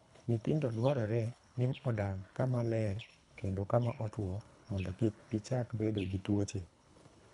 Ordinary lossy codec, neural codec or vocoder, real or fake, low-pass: none; codec, 24 kHz, 3 kbps, HILCodec; fake; 10.8 kHz